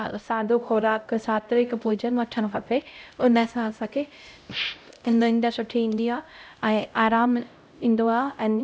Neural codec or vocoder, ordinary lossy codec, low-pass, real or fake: codec, 16 kHz, 0.5 kbps, X-Codec, HuBERT features, trained on LibriSpeech; none; none; fake